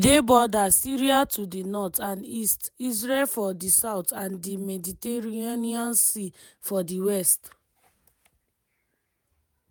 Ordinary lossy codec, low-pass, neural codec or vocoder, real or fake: none; none; vocoder, 48 kHz, 128 mel bands, Vocos; fake